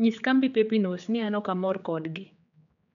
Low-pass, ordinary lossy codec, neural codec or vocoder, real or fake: 7.2 kHz; none; codec, 16 kHz, 4 kbps, X-Codec, HuBERT features, trained on general audio; fake